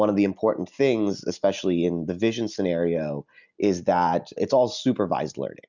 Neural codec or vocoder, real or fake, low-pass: none; real; 7.2 kHz